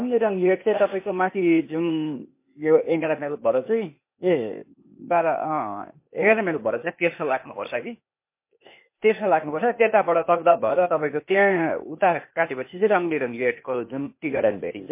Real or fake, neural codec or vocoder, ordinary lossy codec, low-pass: fake; codec, 16 kHz, 0.8 kbps, ZipCodec; MP3, 24 kbps; 3.6 kHz